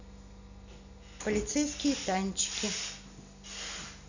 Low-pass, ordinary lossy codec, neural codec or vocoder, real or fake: 7.2 kHz; none; none; real